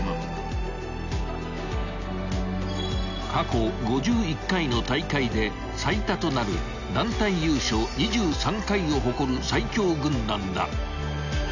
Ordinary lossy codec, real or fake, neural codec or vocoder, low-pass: none; real; none; 7.2 kHz